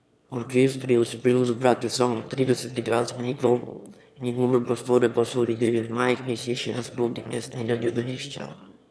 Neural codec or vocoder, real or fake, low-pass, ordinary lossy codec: autoencoder, 22.05 kHz, a latent of 192 numbers a frame, VITS, trained on one speaker; fake; none; none